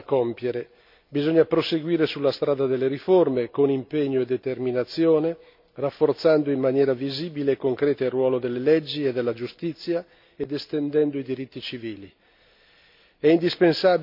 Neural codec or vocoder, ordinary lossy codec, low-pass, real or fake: none; MP3, 48 kbps; 5.4 kHz; real